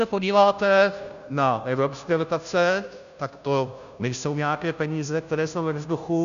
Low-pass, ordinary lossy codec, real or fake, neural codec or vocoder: 7.2 kHz; MP3, 96 kbps; fake; codec, 16 kHz, 0.5 kbps, FunCodec, trained on Chinese and English, 25 frames a second